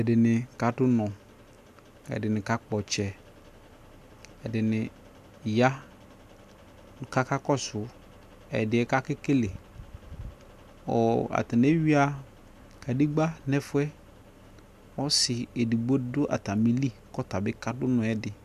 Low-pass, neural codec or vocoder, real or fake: 14.4 kHz; none; real